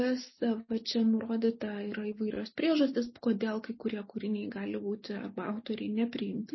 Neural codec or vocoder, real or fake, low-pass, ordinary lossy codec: none; real; 7.2 kHz; MP3, 24 kbps